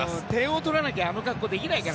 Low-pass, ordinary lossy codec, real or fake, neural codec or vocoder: none; none; real; none